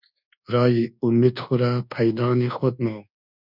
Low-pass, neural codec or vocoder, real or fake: 5.4 kHz; codec, 24 kHz, 1.2 kbps, DualCodec; fake